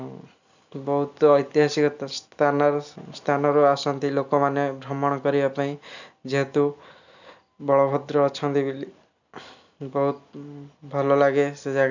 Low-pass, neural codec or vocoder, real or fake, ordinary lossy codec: 7.2 kHz; none; real; none